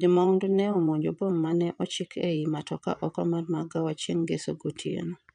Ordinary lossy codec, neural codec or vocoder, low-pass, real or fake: none; vocoder, 22.05 kHz, 80 mel bands, Vocos; 9.9 kHz; fake